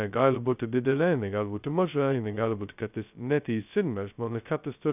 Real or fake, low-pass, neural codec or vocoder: fake; 3.6 kHz; codec, 16 kHz, 0.2 kbps, FocalCodec